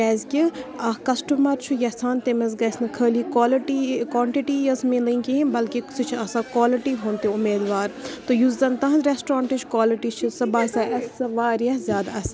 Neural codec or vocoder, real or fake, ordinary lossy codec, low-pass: none; real; none; none